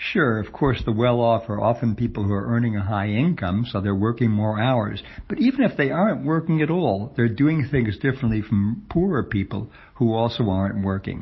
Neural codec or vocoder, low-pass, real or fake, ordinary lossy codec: none; 7.2 kHz; real; MP3, 24 kbps